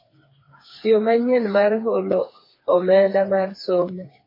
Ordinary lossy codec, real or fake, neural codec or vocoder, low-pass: MP3, 24 kbps; fake; codec, 16 kHz, 4 kbps, FreqCodec, smaller model; 5.4 kHz